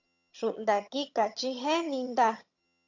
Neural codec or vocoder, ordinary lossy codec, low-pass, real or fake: vocoder, 22.05 kHz, 80 mel bands, HiFi-GAN; AAC, 48 kbps; 7.2 kHz; fake